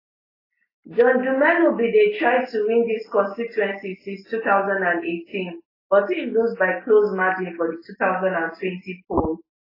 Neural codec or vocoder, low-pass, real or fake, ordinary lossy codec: none; 5.4 kHz; real; AAC, 24 kbps